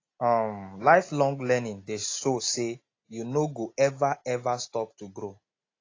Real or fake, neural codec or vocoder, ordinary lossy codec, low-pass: real; none; AAC, 32 kbps; 7.2 kHz